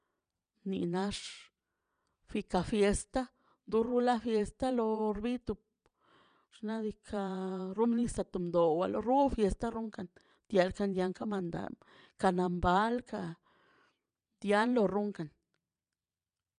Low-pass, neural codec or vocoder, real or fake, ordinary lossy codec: 9.9 kHz; vocoder, 22.05 kHz, 80 mel bands, Vocos; fake; none